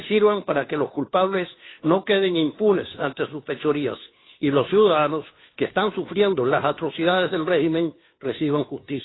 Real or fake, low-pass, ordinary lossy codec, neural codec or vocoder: fake; 7.2 kHz; AAC, 16 kbps; codec, 16 kHz, 2 kbps, FunCodec, trained on Chinese and English, 25 frames a second